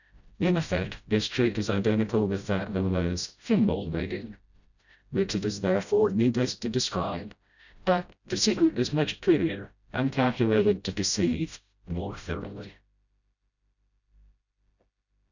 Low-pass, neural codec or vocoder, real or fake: 7.2 kHz; codec, 16 kHz, 0.5 kbps, FreqCodec, smaller model; fake